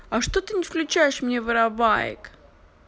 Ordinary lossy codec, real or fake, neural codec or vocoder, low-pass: none; real; none; none